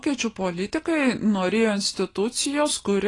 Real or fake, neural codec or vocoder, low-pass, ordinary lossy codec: real; none; 10.8 kHz; AAC, 32 kbps